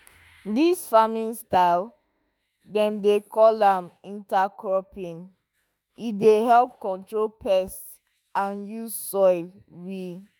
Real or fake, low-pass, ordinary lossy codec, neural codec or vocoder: fake; none; none; autoencoder, 48 kHz, 32 numbers a frame, DAC-VAE, trained on Japanese speech